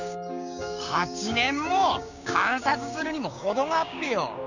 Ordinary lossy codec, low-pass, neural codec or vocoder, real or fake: none; 7.2 kHz; codec, 16 kHz, 6 kbps, DAC; fake